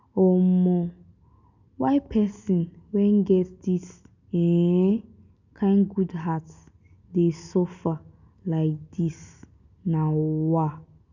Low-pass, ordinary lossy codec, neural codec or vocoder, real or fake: 7.2 kHz; none; none; real